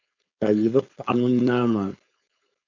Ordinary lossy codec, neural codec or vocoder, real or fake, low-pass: MP3, 64 kbps; codec, 16 kHz, 4.8 kbps, FACodec; fake; 7.2 kHz